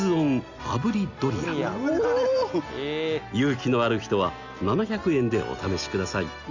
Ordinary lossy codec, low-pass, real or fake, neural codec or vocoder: Opus, 64 kbps; 7.2 kHz; real; none